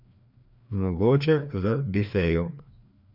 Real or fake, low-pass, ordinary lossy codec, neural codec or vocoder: fake; 5.4 kHz; none; codec, 16 kHz, 2 kbps, FreqCodec, larger model